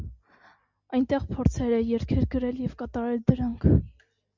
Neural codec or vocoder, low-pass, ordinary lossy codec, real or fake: none; 7.2 kHz; MP3, 64 kbps; real